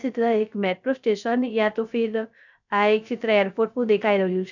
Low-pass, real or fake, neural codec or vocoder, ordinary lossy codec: 7.2 kHz; fake; codec, 16 kHz, 0.3 kbps, FocalCodec; none